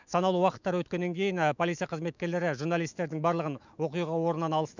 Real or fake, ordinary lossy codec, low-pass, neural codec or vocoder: fake; none; 7.2 kHz; autoencoder, 48 kHz, 128 numbers a frame, DAC-VAE, trained on Japanese speech